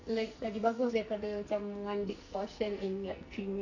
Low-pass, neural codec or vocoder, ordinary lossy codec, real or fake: 7.2 kHz; codec, 44.1 kHz, 2.6 kbps, SNAC; none; fake